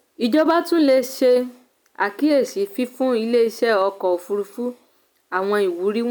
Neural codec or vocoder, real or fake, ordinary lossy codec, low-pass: none; real; none; none